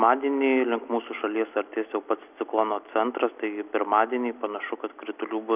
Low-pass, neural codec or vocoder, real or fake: 3.6 kHz; none; real